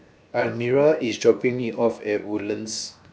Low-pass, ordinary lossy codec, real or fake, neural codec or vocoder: none; none; fake; codec, 16 kHz, 0.7 kbps, FocalCodec